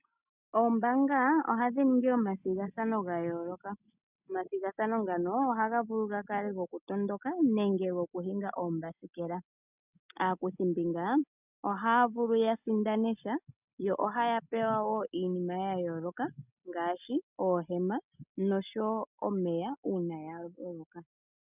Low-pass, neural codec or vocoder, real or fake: 3.6 kHz; none; real